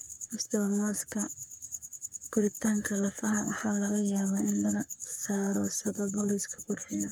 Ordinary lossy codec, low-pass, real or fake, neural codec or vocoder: none; none; fake; codec, 44.1 kHz, 3.4 kbps, Pupu-Codec